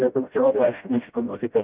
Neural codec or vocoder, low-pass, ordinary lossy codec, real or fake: codec, 16 kHz, 0.5 kbps, FreqCodec, smaller model; 3.6 kHz; Opus, 24 kbps; fake